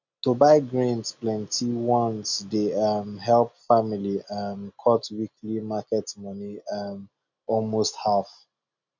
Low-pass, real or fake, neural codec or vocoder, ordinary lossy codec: 7.2 kHz; real; none; none